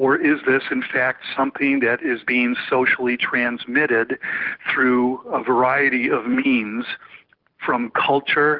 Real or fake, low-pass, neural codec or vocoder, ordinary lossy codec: real; 5.4 kHz; none; Opus, 16 kbps